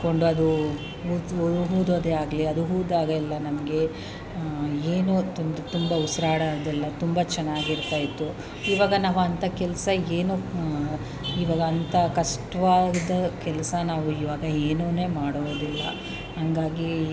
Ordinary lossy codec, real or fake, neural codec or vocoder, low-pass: none; real; none; none